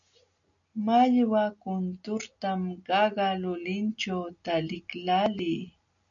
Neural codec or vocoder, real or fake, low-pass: none; real; 7.2 kHz